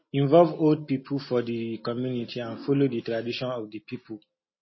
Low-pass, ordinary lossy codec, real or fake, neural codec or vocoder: 7.2 kHz; MP3, 24 kbps; real; none